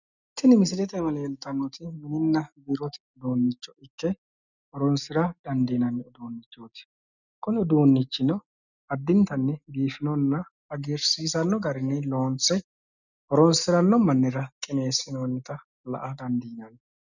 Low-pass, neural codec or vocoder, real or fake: 7.2 kHz; none; real